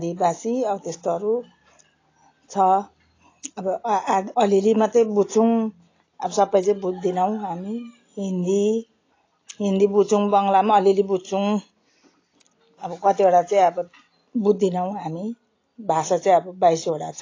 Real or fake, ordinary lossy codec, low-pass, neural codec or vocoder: real; AAC, 32 kbps; 7.2 kHz; none